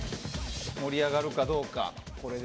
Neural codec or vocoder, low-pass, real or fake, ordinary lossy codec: none; none; real; none